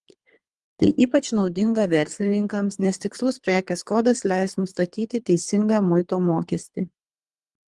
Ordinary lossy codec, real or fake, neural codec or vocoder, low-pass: Opus, 24 kbps; fake; codec, 24 kHz, 3 kbps, HILCodec; 10.8 kHz